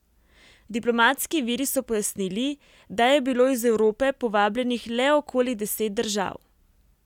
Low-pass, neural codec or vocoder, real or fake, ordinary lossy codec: 19.8 kHz; none; real; none